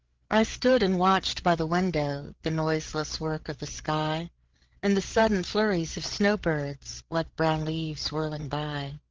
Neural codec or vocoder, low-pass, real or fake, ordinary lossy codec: codec, 16 kHz, 8 kbps, FreqCodec, larger model; 7.2 kHz; fake; Opus, 16 kbps